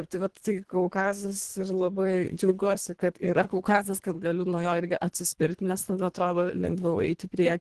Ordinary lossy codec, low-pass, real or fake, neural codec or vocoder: Opus, 16 kbps; 10.8 kHz; fake; codec, 24 kHz, 1.5 kbps, HILCodec